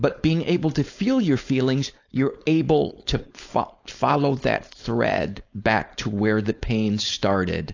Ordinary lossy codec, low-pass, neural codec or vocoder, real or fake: AAC, 48 kbps; 7.2 kHz; codec, 16 kHz, 4.8 kbps, FACodec; fake